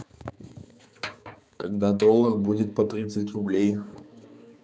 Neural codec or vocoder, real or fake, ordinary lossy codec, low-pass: codec, 16 kHz, 4 kbps, X-Codec, HuBERT features, trained on balanced general audio; fake; none; none